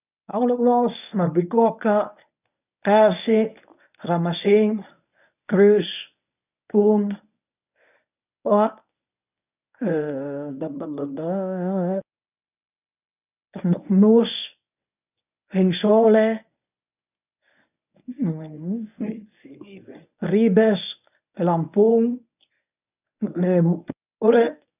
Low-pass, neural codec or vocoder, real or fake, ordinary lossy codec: 3.6 kHz; codec, 24 kHz, 0.9 kbps, WavTokenizer, medium speech release version 1; fake; none